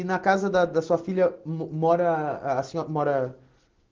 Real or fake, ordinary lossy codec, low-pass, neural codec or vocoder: real; Opus, 16 kbps; 7.2 kHz; none